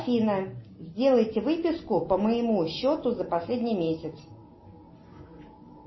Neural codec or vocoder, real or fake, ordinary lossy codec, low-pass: none; real; MP3, 24 kbps; 7.2 kHz